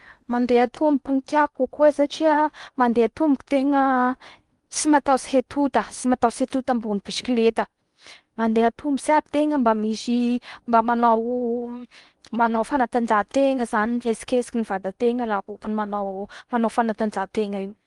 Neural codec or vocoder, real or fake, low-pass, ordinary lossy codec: codec, 16 kHz in and 24 kHz out, 0.8 kbps, FocalCodec, streaming, 65536 codes; fake; 10.8 kHz; Opus, 32 kbps